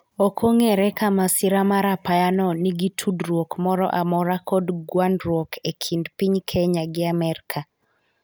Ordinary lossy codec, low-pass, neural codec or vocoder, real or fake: none; none; none; real